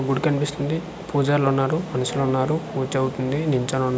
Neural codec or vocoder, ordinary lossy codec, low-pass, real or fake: none; none; none; real